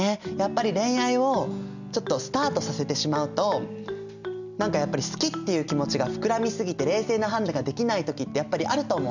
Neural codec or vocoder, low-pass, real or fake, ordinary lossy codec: none; 7.2 kHz; real; none